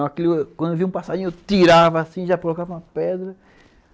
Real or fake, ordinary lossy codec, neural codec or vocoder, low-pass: real; none; none; none